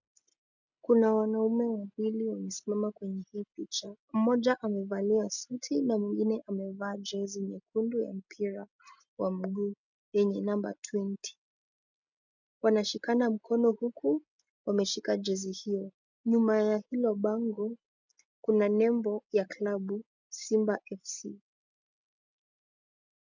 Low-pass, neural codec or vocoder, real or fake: 7.2 kHz; none; real